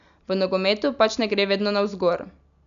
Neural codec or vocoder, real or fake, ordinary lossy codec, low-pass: none; real; none; 7.2 kHz